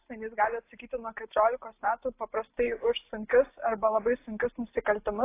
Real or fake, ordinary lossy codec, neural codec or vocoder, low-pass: real; AAC, 24 kbps; none; 3.6 kHz